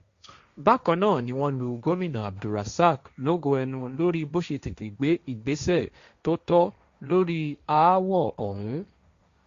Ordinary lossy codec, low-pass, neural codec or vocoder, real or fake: Opus, 64 kbps; 7.2 kHz; codec, 16 kHz, 1.1 kbps, Voila-Tokenizer; fake